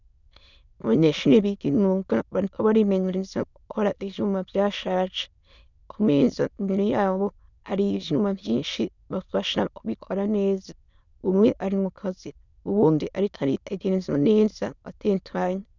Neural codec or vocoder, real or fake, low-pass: autoencoder, 22.05 kHz, a latent of 192 numbers a frame, VITS, trained on many speakers; fake; 7.2 kHz